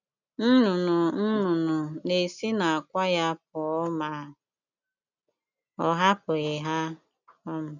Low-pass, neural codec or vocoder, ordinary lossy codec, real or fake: 7.2 kHz; none; none; real